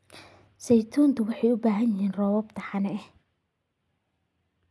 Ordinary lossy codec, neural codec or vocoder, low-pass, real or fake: none; none; none; real